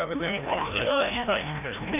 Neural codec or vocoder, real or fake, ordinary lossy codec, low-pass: codec, 16 kHz, 1 kbps, FreqCodec, larger model; fake; none; 3.6 kHz